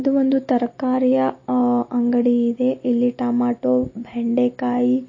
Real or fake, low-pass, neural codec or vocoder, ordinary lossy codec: real; 7.2 kHz; none; MP3, 32 kbps